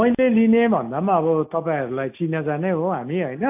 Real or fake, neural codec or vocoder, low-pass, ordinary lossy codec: real; none; 3.6 kHz; AAC, 32 kbps